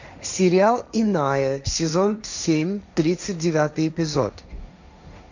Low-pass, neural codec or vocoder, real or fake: 7.2 kHz; codec, 16 kHz, 1.1 kbps, Voila-Tokenizer; fake